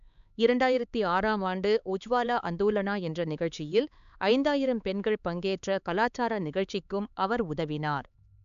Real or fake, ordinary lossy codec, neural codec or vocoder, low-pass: fake; none; codec, 16 kHz, 4 kbps, X-Codec, HuBERT features, trained on LibriSpeech; 7.2 kHz